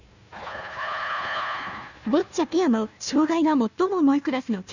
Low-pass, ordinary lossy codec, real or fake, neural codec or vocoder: 7.2 kHz; none; fake; codec, 16 kHz, 1 kbps, FunCodec, trained on Chinese and English, 50 frames a second